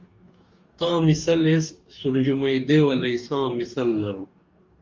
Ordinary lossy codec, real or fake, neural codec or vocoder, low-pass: Opus, 32 kbps; fake; codec, 44.1 kHz, 2.6 kbps, DAC; 7.2 kHz